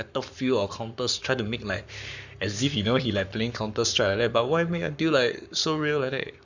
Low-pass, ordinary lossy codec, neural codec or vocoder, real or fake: 7.2 kHz; none; codec, 44.1 kHz, 7.8 kbps, Pupu-Codec; fake